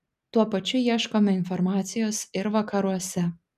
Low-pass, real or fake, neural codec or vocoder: 14.4 kHz; real; none